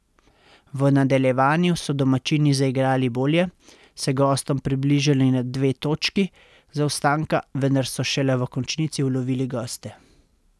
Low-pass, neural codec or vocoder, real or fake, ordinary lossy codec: none; none; real; none